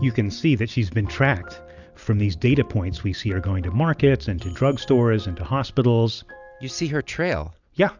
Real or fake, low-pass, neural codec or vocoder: real; 7.2 kHz; none